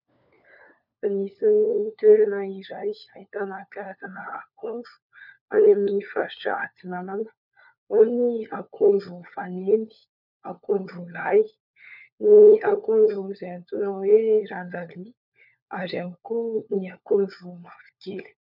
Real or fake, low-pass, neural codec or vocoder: fake; 5.4 kHz; codec, 16 kHz, 4 kbps, FunCodec, trained on LibriTTS, 50 frames a second